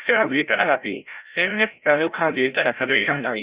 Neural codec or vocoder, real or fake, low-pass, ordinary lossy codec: codec, 16 kHz, 0.5 kbps, FreqCodec, larger model; fake; 3.6 kHz; Opus, 64 kbps